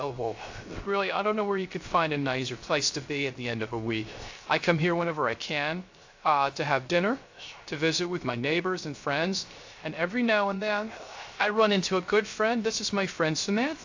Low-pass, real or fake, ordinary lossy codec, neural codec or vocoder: 7.2 kHz; fake; AAC, 48 kbps; codec, 16 kHz, 0.3 kbps, FocalCodec